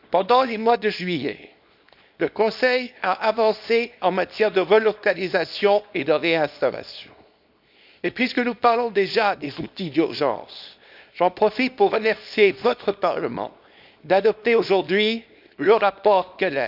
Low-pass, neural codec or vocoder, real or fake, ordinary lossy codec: 5.4 kHz; codec, 24 kHz, 0.9 kbps, WavTokenizer, small release; fake; none